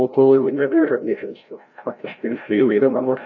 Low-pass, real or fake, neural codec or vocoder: 7.2 kHz; fake; codec, 16 kHz, 0.5 kbps, FreqCodec, larger model